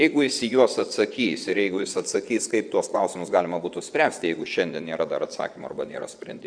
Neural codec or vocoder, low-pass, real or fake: vocoder, 22.05 kHz, 80 mel bands, WaveNeXt; 9.9 kHz; fake